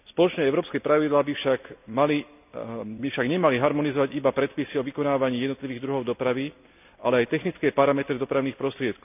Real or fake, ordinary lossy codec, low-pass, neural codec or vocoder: real; none; 3.6 kHz; none